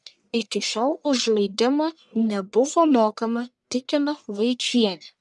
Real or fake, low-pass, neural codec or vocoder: fake; 10.8 kHz; codec, 44.1 kHz, 1.7 kbps, Pupu-Codec